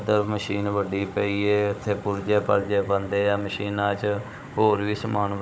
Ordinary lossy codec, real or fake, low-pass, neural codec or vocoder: none; fake; none; codec, 16 kHz, 16 kbps, FunCodec, trained on Chinese and English, 50 frames a second